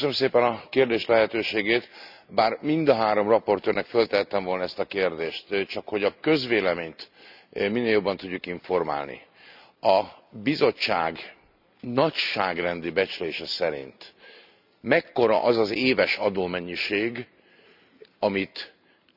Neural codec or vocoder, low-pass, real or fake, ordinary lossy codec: none; 5.4 kHz; real; none